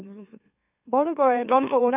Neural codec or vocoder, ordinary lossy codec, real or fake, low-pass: autoencoder, 44.1 kHz, a latent of 192 numbers a frame, MeloTTS; none; fake; 3.6 kHz